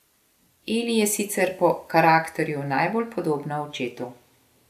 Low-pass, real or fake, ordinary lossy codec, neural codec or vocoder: 14.4 kHz; real; AAC, 96 kbps; none